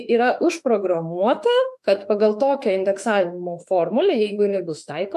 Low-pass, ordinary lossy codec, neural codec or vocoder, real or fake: 14.4 kHz; MP3, 64 kbps; autoencoder, 48 kHz, 32 numbers a frame, DAC-VAE, trained on Japanese speech; fake